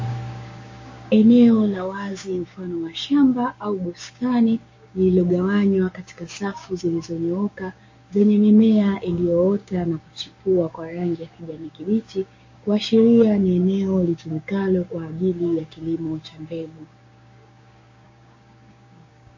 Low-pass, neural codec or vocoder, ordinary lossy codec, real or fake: 7.2 kHz; codec, 16 kHz, 6 kbps, DAC; MP3, 32 kbps; fake